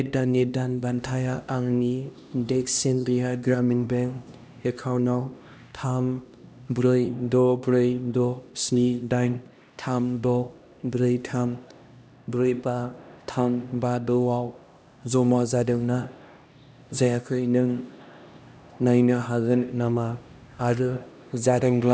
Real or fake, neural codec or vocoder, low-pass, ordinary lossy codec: fake; codec, 16 kHz, 1 kbps, X-Codec, HuBERT features, trained on LibriSpeech; none; none